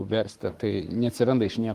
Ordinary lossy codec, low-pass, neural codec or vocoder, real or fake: Opus, 32 kbps; 14.4 kHz; codec, 44.1 kHz, 7.8 kbps, Pupu-Codec; fake